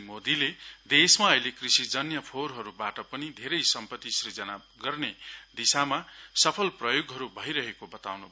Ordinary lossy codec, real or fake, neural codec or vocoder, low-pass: none; real; none; none